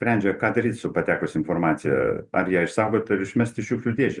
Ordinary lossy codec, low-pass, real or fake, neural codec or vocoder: Opus, 64 kbps; 10.8 kHz; real; none